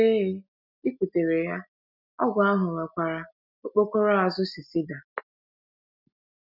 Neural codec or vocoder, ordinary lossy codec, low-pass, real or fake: none; none; 5.4 kHz; real